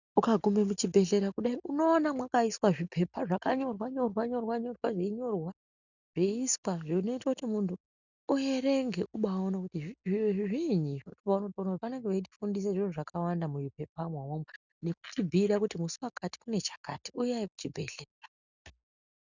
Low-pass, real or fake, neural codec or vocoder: 7.2 kHz; real; none